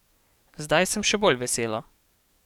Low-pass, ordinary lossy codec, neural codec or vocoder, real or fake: 19.8 kHz; none; codec, 44.1 kHz, 7.8 kbps, DAC; fake